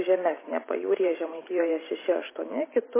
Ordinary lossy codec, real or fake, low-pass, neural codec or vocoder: MP3, 16 kbps; fake; 3.6 kHz; vocoder, 44.1 kHz, 128 mel bands every 512 samples, BigVGAN v2